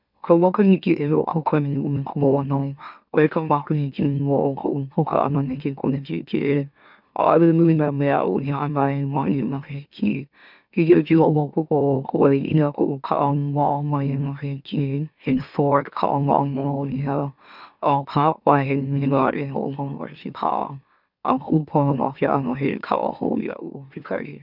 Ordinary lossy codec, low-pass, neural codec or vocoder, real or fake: none; 5.4 kHz; autoencoder, 44.1 kHz, a latent of 192 numbers a frame, MeloTTS; fake